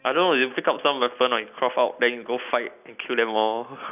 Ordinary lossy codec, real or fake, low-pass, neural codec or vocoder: none; real; 3.6 kHz; none